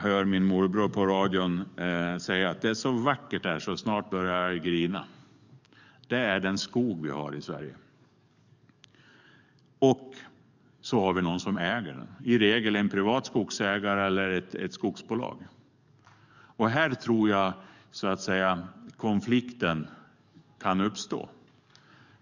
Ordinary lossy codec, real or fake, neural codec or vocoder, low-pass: none; fake; codec, 44.1 kHz, 7.8 kbps, DAC; 7.2 kHz